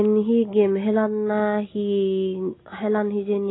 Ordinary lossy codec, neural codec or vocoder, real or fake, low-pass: AAC, 16 kbps; none; real; 7.2 kHz